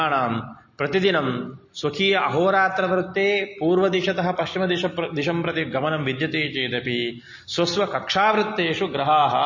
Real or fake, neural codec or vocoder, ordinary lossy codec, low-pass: real; none; MP3, 32 kbps; 7.2 kHz